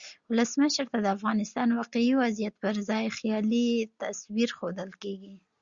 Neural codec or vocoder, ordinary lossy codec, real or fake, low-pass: none; Opus, 64 kbps; real; 7.2 kHz